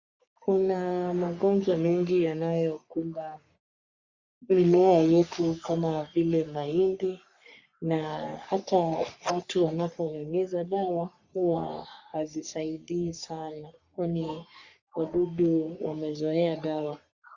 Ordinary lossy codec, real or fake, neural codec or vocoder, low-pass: Opus, 64 kbps; fake; codec, 44.1 kHz, 3.4 kbps, Pupu-Codec; 7.2 kHz